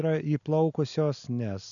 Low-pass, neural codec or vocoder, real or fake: 7.2 kHz; none; real